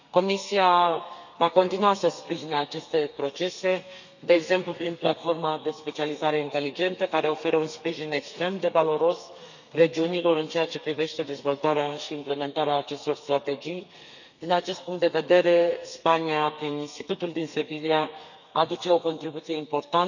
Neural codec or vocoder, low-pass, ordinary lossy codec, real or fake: codec, 32 kHz, 1.9 kbps, SNAC; 7.2 kHz; none; fake